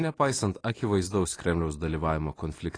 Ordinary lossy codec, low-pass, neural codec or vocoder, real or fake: AAC, 32 kbps; 9.9 kHz; vocoder, 44.1 kHz, 128 mel bands every 256 samples, BigVGAN v2; fake